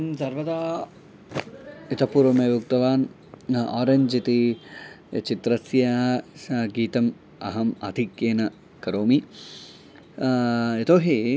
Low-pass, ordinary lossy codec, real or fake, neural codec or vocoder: none; none; real; none